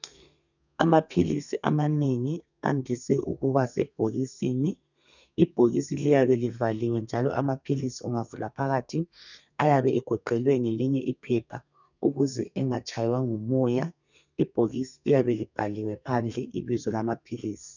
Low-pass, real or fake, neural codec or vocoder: 7.2 kHz; fake; codec, 32 kHz, 1.9 kbps, SNAC